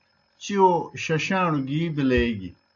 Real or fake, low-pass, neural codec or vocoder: real; 7.2 kHz; none